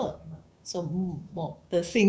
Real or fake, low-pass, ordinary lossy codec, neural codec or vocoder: fake; none; none; codec, 16 kHz, 6 kbps, DAC